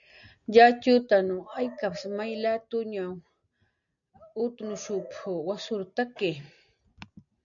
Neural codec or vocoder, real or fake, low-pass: none; real; 7.2 kHz